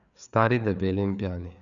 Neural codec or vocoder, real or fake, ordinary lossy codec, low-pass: codec, 16 kHz, 4 kbps, FreqCodec, larger model; fake; none; 7.2 kHz